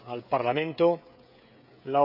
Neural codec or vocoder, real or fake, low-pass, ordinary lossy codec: codec, 16 kHz, 16 kbps, FreqCodec, smaller model; fake; 5.4 kHz; none